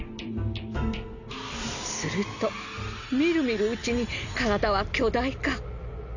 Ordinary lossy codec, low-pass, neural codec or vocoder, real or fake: none; 7.2 kHz; none; real